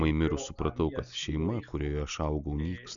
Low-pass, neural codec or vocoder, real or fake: 7.2 kHz; none; real